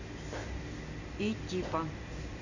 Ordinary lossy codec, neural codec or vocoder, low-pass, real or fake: none; none; 7.2 kHz; real